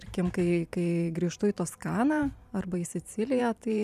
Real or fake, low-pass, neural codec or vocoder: fake; 14.4 kHz; vocoder, 44.1 kHz, 128 mel bands every 512 samples, BigVGAN v2